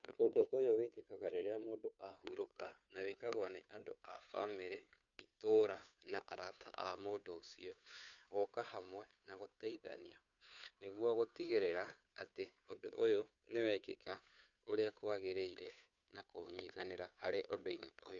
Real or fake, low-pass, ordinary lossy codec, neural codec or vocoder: fake; 7.2 kHz; MP3, 96 kbps; codec, 16 kHz, 2 kbps, FunCodec, trained on Chinese and English, 25 frames a second